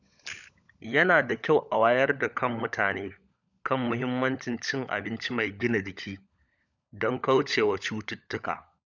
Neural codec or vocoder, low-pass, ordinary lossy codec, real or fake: codec, 16 kHz, 16 kbps, FunCodec, trained on LibriTTS, 50 frames a second; 7.2 kHz; none; fake